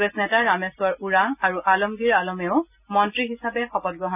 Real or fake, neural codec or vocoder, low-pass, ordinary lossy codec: real; none; 3.6 kHz; none